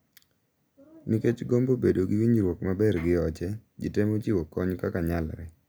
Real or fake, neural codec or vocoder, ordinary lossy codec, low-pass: real; none; none; none